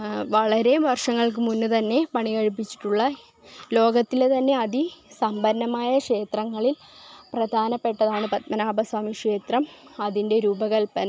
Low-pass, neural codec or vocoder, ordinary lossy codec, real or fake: none; none; none; real